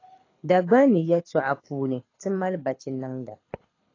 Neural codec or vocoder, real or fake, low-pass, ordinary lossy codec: codec, 24 kHz, 6 kbps, HILCodec; fake; 7.2 kHz; AAC, 32 kbps